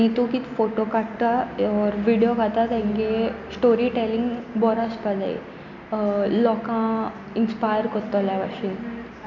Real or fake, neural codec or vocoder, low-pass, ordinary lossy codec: real; none; 7.2 kHz; none